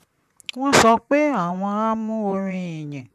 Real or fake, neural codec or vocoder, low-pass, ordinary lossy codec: fake; vocoder, 44.1 kHz, 128 mel bands, Pupu-Vocoder; 14.4 kHz; none